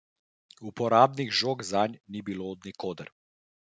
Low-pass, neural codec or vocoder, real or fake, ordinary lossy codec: none; none; real; none